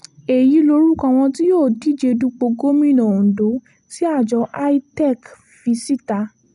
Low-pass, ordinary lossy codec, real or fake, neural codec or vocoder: 10.8 kHz; none; real; none